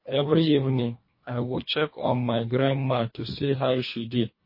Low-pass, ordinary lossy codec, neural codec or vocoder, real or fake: 5.4 kHz; MP3, 24 kbps; codec, 24 kHz, 1.5 kbps, HILCodec; fake